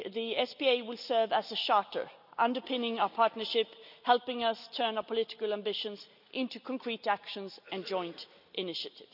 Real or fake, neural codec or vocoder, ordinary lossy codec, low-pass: real; none; none; 5.4 kHz